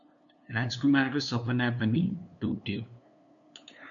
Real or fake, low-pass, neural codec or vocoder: fake; 7.2 kHz; codec, 16 kHz, 2 kbps, FunCodec, trained on LibriTTS, 25 frames a second